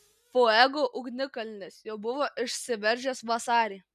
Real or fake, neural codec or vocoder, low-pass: real; none; 14.4 kHz